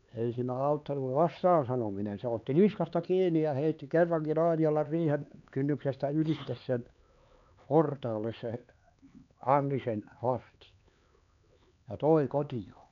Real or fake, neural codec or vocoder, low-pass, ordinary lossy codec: fake; codec, 16 kHz, 4 kbps, X-Codec, HuBERT features, trained on LibriSpeech; 7.2 kHz; none